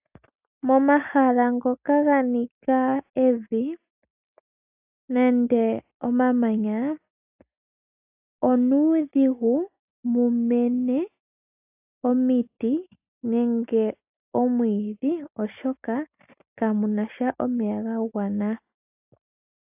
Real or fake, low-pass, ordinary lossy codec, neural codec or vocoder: real; 3.6 kHz; AAC, 32 kbps; none